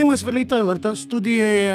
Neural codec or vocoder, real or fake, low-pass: codec, 32 kHz, 1.9 kbps, SNAC; fake; 14.4 kHz